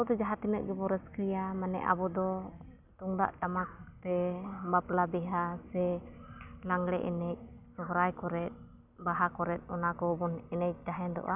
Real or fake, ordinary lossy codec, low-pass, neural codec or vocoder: real; none; 3.6 kHz; none